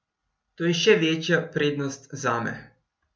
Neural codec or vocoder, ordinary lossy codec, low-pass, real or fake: none; none; none; real